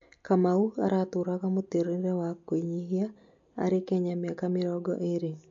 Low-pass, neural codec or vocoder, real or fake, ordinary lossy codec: 7.2 kHz; none; real; MP3, 48 kbps